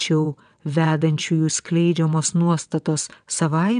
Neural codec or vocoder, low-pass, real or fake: vocoder, 22.05 kHz, 80 mel bands, WaveNeXt; 9.9 kHz; fake